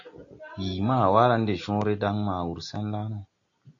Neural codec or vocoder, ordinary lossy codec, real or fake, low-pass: none; AAC, 48 kbps; real; 7.2 kHz